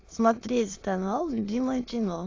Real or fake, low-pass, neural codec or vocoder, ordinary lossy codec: fake; 7.2 kHz; autoencoder, 22.05 kHz, a latent of 192 numbers a frame, VITS, trained on many speakers; AAC, 32 kbps